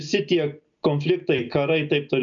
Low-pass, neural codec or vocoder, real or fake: 7.2 kHz; none; real